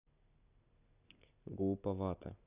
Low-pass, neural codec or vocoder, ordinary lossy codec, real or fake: 3.6 kHz; none; none; real